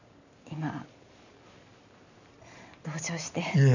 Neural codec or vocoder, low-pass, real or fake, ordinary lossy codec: none; 7.2 kHz; real; none